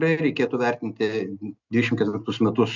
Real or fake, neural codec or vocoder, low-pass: real; none; 7.2 kHz